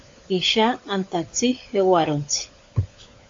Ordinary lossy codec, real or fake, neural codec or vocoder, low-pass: AAC, 48 kbps; fake; codec, 16 kHz, 16 kbps, FunCodec, trained on LibriTTS, 50 frames a second; 7.2 kHz